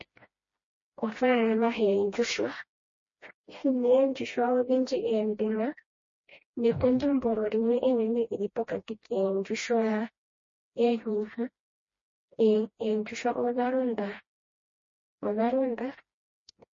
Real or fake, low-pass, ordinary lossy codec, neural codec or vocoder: fake; 7.2 kHz; MP3, 32 kbps; codec, 16 kHz, 1 kbps, FreqCodec, smaller model